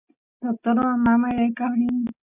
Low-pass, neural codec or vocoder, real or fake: 3.6 kHz; none; real